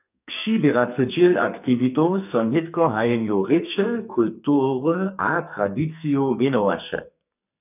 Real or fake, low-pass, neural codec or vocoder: fake; 3.6 kHz; codec, 44.1 kHz, 2.6 kbps, SNAC